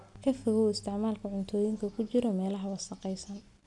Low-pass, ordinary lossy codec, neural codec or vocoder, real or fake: 10.8 kHz; none; none; real